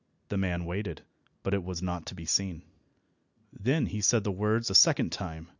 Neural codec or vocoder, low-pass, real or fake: none; 7.2 kHz; real